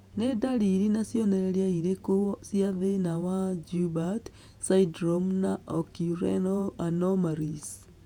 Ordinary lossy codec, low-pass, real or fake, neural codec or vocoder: none; 19.8 kHz; fake; vocoder, 48 kHz, 128 mel bands, Vocos